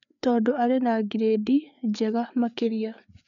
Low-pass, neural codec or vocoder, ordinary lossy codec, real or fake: 7.2 kHz; codec, 16 kHz, 4 kbps, FreqCodec, larger model; none; fake